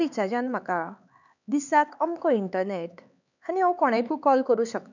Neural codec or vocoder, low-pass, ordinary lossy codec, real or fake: codec, 16 kHz, 4 kbps, X-Codec, HuBERT features, trained on LibriSpeech; 7.2 kHz; none; fake